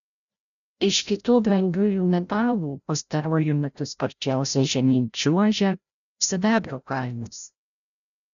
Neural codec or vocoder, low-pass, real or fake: codec, 16 kHz, 0.5 kbps, FreqCodec, larger model; 7.2 kHz; fake